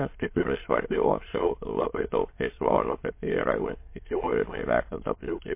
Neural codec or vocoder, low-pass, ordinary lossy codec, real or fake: autoencoder, 22.05 kHz, a latent of 192 numbers a frame, VITS, trained on many speakers; 3.6 kHz; MP3, 24 kbps; fake